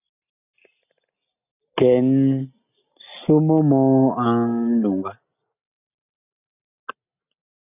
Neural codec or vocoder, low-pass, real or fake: none; 3.6 kHz; real